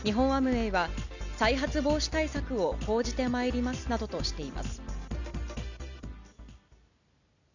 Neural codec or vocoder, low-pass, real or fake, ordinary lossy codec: none; 7.2 kHz; real; none